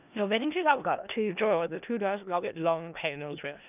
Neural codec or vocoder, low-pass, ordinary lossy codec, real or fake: codec, 16 kHz in and 24 kHz out, 0.4 kbps, LongCat-Audio-Codec, four codebook decoder; 3.6 kHz; none; fake